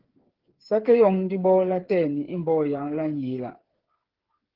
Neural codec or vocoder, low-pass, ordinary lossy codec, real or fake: codec, 16 kHz, 8 kbps, FreqCodec, smaller model; 5.4 kHz; Opus, 16 kbps; fake